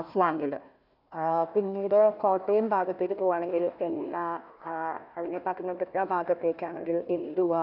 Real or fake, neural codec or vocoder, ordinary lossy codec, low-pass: fake; codec, 16 kHz, 1 kbps, FunCodec, trained on Chinese and English, 50 frames a second; none; 5.4 kHz